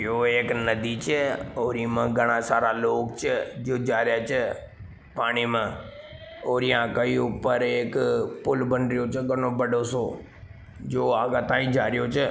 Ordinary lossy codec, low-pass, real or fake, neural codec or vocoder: none; none; real; none